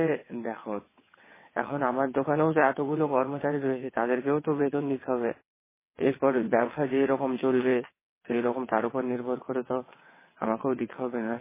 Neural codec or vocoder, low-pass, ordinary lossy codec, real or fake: vocoder, 22.05 kHz, 80 mel bands, WaveNeXt; 3.6 kHz; MP3, 16 kbps; fake